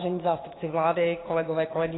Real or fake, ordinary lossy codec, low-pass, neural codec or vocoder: fake; AAC, 16 kbps; 7.2 kHz; codec, 16 kHz, 6 kbps, DAC